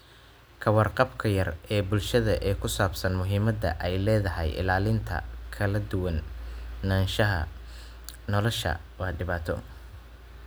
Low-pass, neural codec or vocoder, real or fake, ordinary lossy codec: none; none; real; none